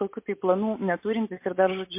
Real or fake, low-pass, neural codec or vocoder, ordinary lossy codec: real; 3.6 kHz; none; MP3, 24 kbps